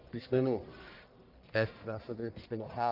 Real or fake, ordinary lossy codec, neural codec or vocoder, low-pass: fake; Opus, 24 kbps; codec, 44.1 kHz, 1.7 kbps, Pupu-Codec; 5.4 kHz